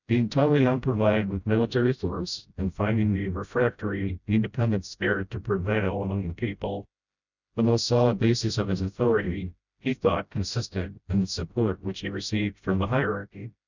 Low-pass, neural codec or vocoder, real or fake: 7.2 kHz; codec, 16 kHz, 0.5 kbps, FreqCodec, smaller model; fake